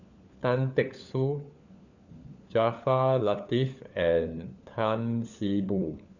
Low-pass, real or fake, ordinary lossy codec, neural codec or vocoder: 7.2 kHz; fake; none; codec, 16 kHz, 4 kbps, FunCodec, trained on LibriTTS, 50 frames a second